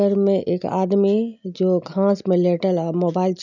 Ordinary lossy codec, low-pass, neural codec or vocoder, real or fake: none; 7.2 kHz; none; real